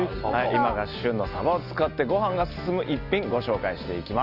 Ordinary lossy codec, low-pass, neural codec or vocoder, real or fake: Opus, 24 kbps; 5.4 kHz; none; real